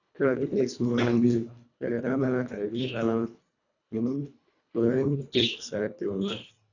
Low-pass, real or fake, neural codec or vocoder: 7.2 kHz; fake; codec, 24 kHz, 1.5 kbps, HILCodec